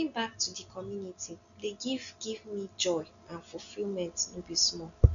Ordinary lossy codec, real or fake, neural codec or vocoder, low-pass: none; real; none; 7.2 kHz